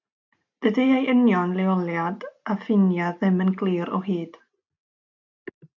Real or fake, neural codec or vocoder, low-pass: real; none; 7.2 kHz